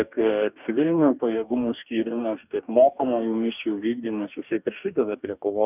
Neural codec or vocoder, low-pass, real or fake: codec, 44.1 kHz, 2.6 kbps, DAC; 3.6 kHz; fake